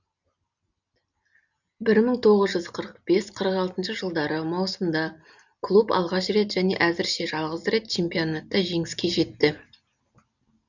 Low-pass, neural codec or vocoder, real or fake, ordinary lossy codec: 7.2 kHz; none; real; none